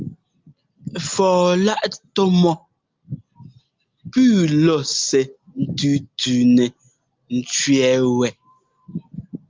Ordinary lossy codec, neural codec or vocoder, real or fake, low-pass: Opus, 32 kbps; none; real; 7.2 kHz